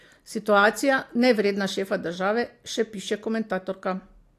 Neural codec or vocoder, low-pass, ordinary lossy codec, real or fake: none; 14.4 kHz; AAC, 64 kbps; real